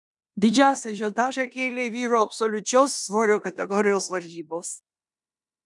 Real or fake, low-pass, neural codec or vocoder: fake; 10.8 kHz; codec, 16 kHz in and 24 kHz out, 0.9 kbps, LongCat-Audio-Codec, four codebook decoder